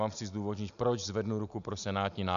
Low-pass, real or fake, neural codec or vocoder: 7.2 kHz; real; none